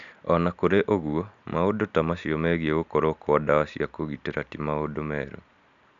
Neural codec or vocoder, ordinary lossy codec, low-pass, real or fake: none; AAC, 96 kbps; 7.2 kHz; real